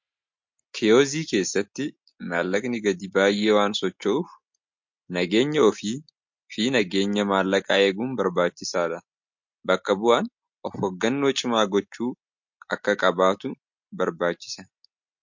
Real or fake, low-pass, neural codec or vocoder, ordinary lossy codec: real; 7.2 kHz; none; MP3, 48 kbps